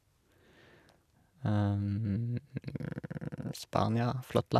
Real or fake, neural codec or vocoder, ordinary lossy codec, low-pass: real; none; none; 14.4 kHz